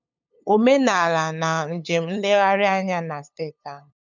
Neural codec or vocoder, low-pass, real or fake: codec, 16 kHz, 8 kbps, FunCodec, trained on LibriTTS, 25 frames a second; 7.2 kHz; fake